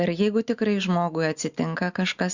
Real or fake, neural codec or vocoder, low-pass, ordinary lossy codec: real; none; 7.2 kHz; Opus, 64 kbps